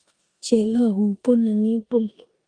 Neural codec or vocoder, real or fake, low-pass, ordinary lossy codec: codec, 16 kHz in and 24 kHz out, 0.9 kbps, LongCat-Audio-Codec, four codebook decoder; fake; 9.9 kHz; Opus, 32 kbps